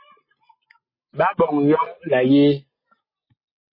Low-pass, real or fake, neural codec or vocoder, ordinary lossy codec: 5.4 kHz; real; none; MP3, 24 kbps